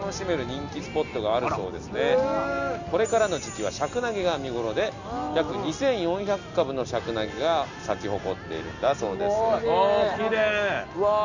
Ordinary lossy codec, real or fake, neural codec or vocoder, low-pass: Opus, 64 kbps; real; none; 7.2 kHz